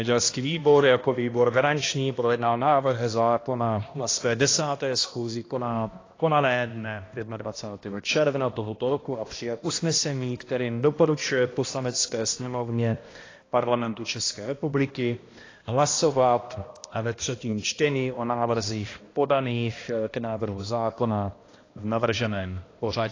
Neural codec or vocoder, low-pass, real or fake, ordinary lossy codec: codec, 16 kHz, 1 kbps, X-Codec, HuBERT features, trained on balanced general audio; 7.2 kHz; fake; AAC, 32 kbps